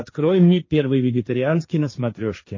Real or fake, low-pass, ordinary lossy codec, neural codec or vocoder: fake; 7.2 kHz; MP3, 32 kbps; codec, 16 kHz, 1.1 kbps, Voila-Tokenizer